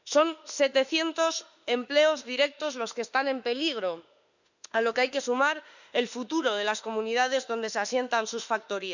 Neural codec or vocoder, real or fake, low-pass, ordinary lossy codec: autoencoder, 48 kHz, 32 numbers a frame, DAC-VAE, trained on Japanese speech; fake; 7.2 kHz; none